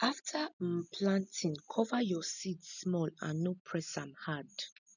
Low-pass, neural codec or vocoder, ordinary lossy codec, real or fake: 7.2 kHz; none; none; real